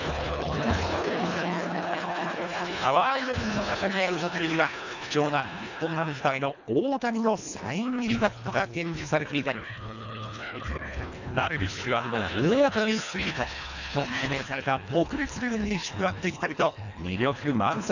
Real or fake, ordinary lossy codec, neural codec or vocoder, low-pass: fake; none; codec, 24 kHz, 1.5 kbps, HILCodec; 7.2 kHz